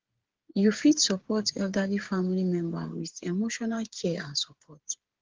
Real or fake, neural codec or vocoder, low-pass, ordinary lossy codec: fake; codec, 16 kHz, 8 kbps, FreqCodec, smaller model; 7.2 kHz; Opus, 16 kbps